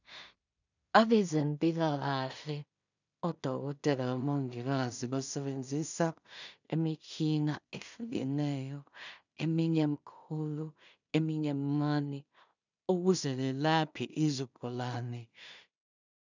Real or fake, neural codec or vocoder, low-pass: fake; codec, 16 kHz in and 24 kHz out, 0.4 kbps, LongCat-Audio-Codec, two codebook decoder; 7.2 kHz